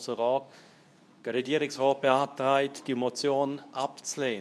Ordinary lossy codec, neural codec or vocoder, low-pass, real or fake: none; codec, 24 kHz, 0.9 kbps, WavTokenizer, medium speech release version 2; none; fake